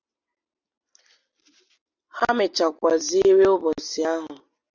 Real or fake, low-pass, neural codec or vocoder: real; 7.2 kHz; none